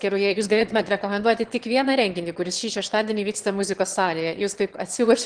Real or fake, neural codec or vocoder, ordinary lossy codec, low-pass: fake; autoencoder, 22.05 kHz, a latent of 192 numbers a frame, VITS, trained on one speaker; Opus, 16 kbps; 9.9 kHz